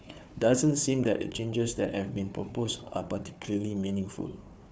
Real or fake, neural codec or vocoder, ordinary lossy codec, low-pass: fake; codec, 16 kHz, 4 kbps, FunCodec, trained on Chinese and English, 50 frames a second; none; none